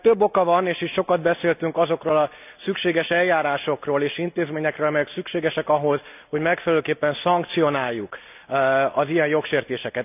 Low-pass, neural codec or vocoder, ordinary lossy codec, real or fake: 3.6 kHz; none; none; real